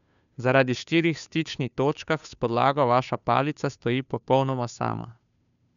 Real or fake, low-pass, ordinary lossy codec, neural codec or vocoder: fake; 7.2 kHz; none; codec, 16 kHz, 2 kbps, FunCodec, trained on Chinese and English, 25 frames a second